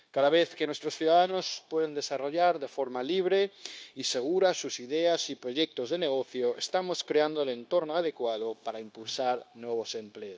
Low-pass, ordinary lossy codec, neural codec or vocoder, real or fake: none; none; codec, 16 kHz, 0.9 kbps, LongCat-Audio-Codec; fake